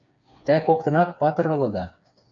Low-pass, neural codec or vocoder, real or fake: 7.2 kHz; codec, 16 kHz, 4 kbps, FreqCodec, smaller model; fake